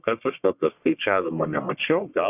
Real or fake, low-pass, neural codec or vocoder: fake; 3.6 kHz; codec, 44.1 kHz, 1.7 kbps, Pupu-Codec